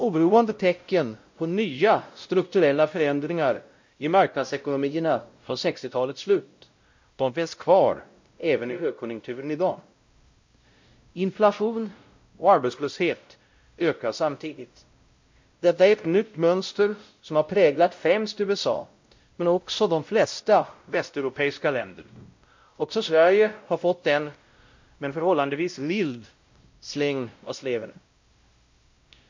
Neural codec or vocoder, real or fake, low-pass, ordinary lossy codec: codec, 16 kHz, 0.5 kbps, X-Codec, WavLM features, trained on Multilingual LibriSpeech; fake; 7.2 kHz; MP3, 48 kbps